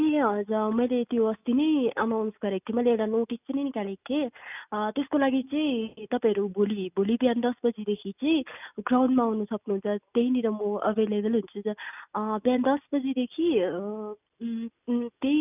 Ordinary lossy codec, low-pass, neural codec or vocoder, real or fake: none; 3.6 kHz; none; real